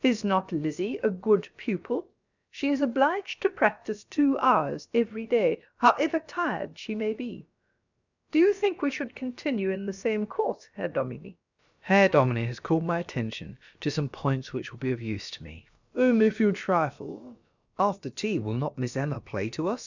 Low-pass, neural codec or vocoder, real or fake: 7.2 kHz; codec, 16 kHz, about 1 kbps, DyCAST, with the encoder's durations; fake